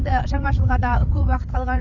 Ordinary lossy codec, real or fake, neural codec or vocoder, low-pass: none; fake; codec, 16 kHz, 8 kbps, FreqCodec, larger model; 7.2 kHz